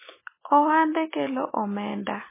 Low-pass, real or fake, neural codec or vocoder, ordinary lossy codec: 3.6 kHz; real; none; MP3, 16 kbps